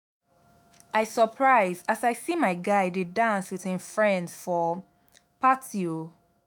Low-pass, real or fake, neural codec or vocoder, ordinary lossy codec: none; fake; autoencoder, 48 kHz, 128 numbers a frame, DAC-VAE, trained on Japanese speech; none